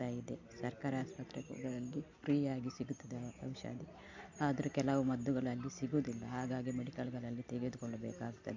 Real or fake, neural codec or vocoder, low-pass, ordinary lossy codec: real; none; 7.2 kHz; MP3, 48 kbps